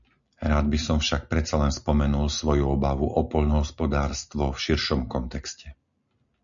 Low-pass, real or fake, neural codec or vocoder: 7.2 kHz; real; none